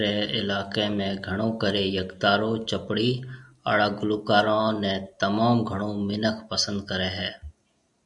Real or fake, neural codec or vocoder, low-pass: real; none; 10.8 kHz